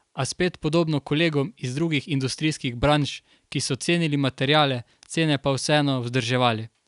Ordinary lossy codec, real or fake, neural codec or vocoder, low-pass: none; real; none; 10.8 kHz